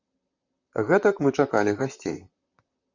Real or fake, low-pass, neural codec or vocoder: fake; 7.2 kHz; vocoder, 44.1 kHz, 128 mel bands, Pupu-Vocoder